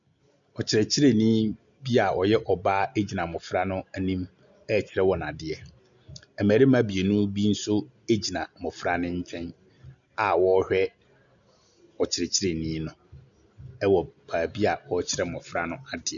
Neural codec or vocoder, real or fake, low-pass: none; real; 7.2 kHz